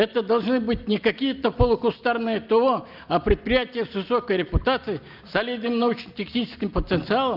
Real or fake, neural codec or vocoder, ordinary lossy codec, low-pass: real; none; Opus, 24 kbps; 5.4 kHz